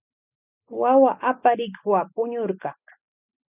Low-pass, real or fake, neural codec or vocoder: 3.6 kHz; real; none